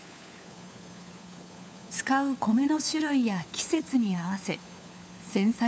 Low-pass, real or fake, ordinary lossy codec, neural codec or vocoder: none; fake; none; codec, 16 kHz, 4 kbps, FunCodec, trained on LibriTTS, 50 frames a second